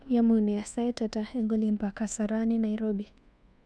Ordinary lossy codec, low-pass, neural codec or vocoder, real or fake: none; none; codec, 24 kHz, 1.2 kbps, DualCodec; fake